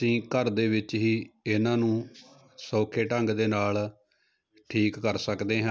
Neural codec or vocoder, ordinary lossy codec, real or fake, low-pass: none; none; real; none